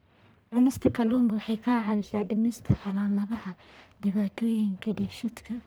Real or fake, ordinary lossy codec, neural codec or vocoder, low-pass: fake; none; codec, 44.1 kHz, 1.7 kbps, Pupu-Codec; none